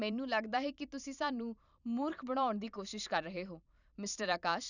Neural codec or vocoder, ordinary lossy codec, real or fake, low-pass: vocoder, 44.1 kHz, 128 mel bands every 512 samples, BigVGAN v2; none; fake; 7.2 kHz